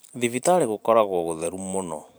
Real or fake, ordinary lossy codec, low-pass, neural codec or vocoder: fake; none; none; vocoder, 44.1 kHz, 128 mel bands every 512 samples, BigVGAN v2